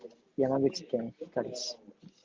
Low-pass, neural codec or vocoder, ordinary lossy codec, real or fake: 7.2 kHz; none; Opus, 24 kbps; real